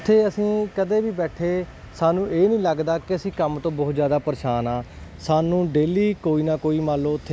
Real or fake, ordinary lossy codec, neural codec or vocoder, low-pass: real; none; none; none